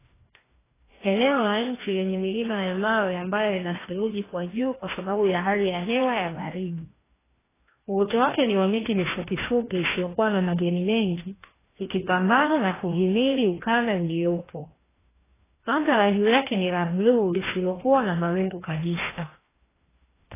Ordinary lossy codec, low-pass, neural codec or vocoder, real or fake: AAC, 16 kbps; 3.6 kHz; codec, 16 kHz, 1 kbps, FreqCodec, larger model; fake